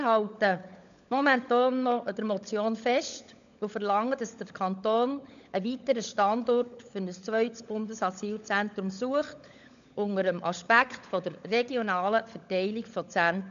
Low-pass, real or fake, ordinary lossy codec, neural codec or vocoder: 7.2 kHz; fake; AAC, 96 kbps; codec, 16 kHz, 16 kbps, FunCodec, trained on LibriTTS, 50 frames a second